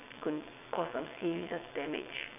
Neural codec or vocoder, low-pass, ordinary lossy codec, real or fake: vocoder, 22.05 kHz, 80 mel bands, WaveNeXt; 3.6 kHz; none; fake